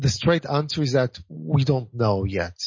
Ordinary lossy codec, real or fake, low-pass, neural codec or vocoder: MP3, 32 kbps; real; 7.2 kHz; none